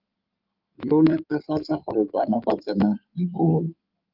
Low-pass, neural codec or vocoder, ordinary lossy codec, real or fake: 5.4 kHz; codec, 16 kHz in and 24 kHz out, 2.2 kbps, FireRedTTS-2 codec; Opus, 24 kbps; fake